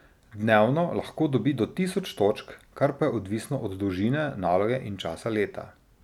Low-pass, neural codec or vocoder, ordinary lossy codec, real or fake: 19.8 kHz; none; none; real